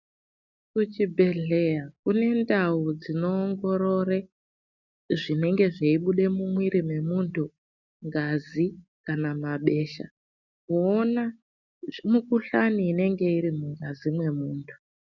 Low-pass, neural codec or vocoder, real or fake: 7.2 kHz; none; real